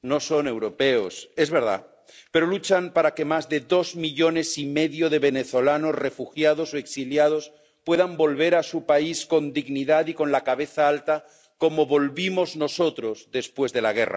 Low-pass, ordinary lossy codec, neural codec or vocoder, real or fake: none; none; none; real